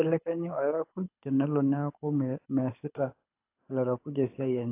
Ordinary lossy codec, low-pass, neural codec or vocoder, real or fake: AAC, 24 kbps; 3.6 kHz; codec, 24 kHz, 6 kbps, HILCodec; fake